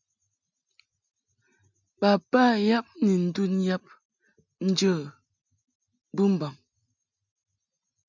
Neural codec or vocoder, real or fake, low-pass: none; real; 7.2 kHz